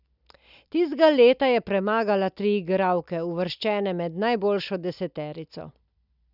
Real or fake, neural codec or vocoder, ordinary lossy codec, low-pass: real; none; none; 5.4 kHz